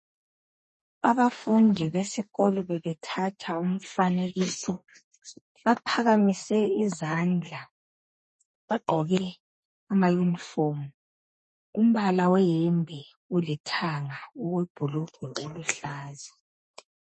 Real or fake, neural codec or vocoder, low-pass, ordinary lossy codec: fake; codec, 32 kHz, 1.9 kbps, SNAC; 10.8 kHz; MP3, 32 kbps